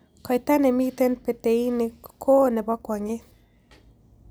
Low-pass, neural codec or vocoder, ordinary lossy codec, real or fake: none; none; none; real